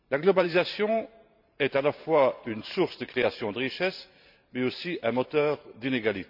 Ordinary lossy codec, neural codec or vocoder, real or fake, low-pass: none; vocoder, 44.1 kHz, 128 mel bands every 512 samples, BigVGAN v2; fake; 5.4 kHz